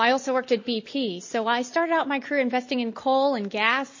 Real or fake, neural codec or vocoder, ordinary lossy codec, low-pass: real; none; MP3, 32 kbps; 7.2 kHz